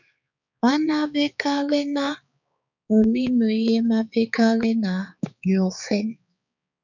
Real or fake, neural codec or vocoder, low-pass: fake; codec, 16 kHz, 4 kbps, X-Codec, HuBERT features, trained on balanced general audio; 7.2 kHz